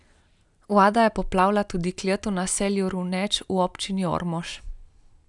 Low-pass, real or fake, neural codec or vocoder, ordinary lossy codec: 10.8 kHz; real; none; none